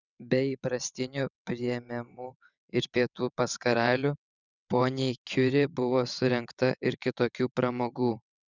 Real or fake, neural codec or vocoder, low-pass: fake; vocoder, 22.05 kHz, 80 mel bands, WaveNeXt; 7.2 kHz